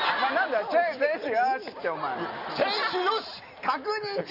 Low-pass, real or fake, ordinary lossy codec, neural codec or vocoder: 5.4 kHz; real; none; none